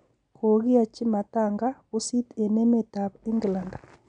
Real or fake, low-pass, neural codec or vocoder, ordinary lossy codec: real; 9.9 kHz; none; none